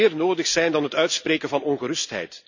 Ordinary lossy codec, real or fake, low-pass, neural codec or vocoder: none; real; 7.2 kHz; none